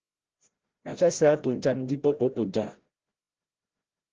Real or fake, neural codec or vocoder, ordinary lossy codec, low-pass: fake; codec, 16 kHz, 0.5 kbps, FreqCodec, larger model; Opus, 16 kbps; 7.2 kHz